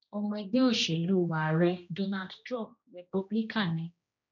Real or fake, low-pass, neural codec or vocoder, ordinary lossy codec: fake; 7.2 kHz; codec, 16 kHz, 1 kbps, X-Codec, HuBERT features, trained on general audio; none